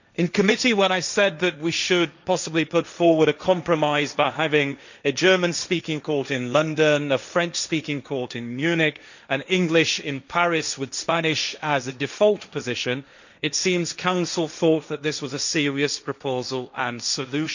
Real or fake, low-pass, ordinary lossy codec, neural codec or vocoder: fake; 7.2 kHz; none; codec, 16 kHz, 1.1 kbps, Voila-Tokenizer